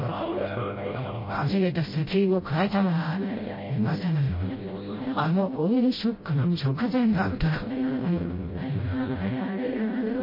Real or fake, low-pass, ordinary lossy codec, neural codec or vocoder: fake; 5.4 kHz; MP3, 24 kbps; codec, 16 kHz, 0.5 kbps, FreqCodec, smaller model